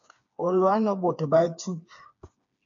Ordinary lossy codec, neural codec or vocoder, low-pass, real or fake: MP3, 96 kbps; codec, 16 kHz, 4 kbps, FreqCodec, smaller model; 7.2 kHz; fake